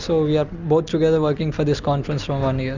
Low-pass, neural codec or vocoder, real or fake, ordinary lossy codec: 7.2 kHz; none; real; Opus, 64 kbps